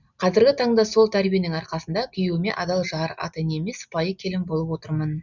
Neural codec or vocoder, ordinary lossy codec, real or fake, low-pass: none; none; real; 7.2 kHz